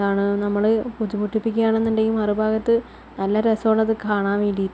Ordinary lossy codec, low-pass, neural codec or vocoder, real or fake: none; none; none; real